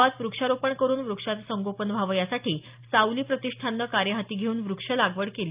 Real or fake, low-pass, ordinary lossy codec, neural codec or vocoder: real; 3.6 kHz; Opus, 24 kbps; none